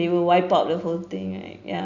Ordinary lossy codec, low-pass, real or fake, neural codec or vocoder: none; 7.2 kHz; real; none